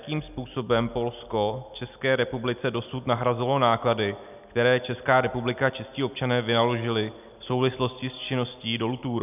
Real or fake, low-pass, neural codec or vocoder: real; 3.6 kHz; none